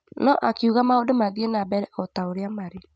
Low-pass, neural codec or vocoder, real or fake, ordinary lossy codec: none; none; real; none